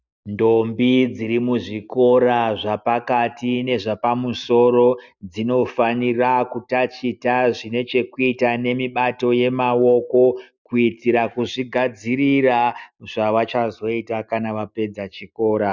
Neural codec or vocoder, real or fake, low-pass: none; real; 7.2 kHz